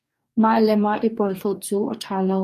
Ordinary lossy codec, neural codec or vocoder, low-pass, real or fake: MP3, 64 kbps; codec, 44.1 kHz, 2.6 kbps, DAC; 14.4 kHz; fake